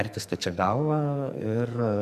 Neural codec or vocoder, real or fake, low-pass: codec, 44.1 kHz, 2.6 kbps, SNAC; fake; 14.4 kHz